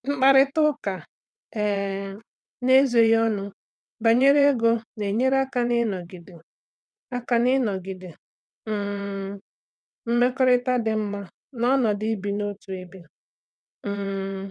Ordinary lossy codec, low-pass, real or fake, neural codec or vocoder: none; none; fake; vocoder, 22.05 kHz, 80 mel bands, WaveNeXt